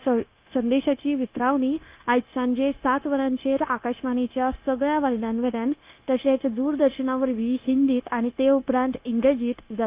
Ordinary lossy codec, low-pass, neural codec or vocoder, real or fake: Opus, 24 kbps; 3.6 kHz; codec, 16 kHz, 0.9 kbps, LongCat-Audio-Codec; fake